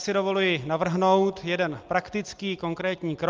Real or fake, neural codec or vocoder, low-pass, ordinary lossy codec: real; none; 7.2 kHz; Opus, 32 kbps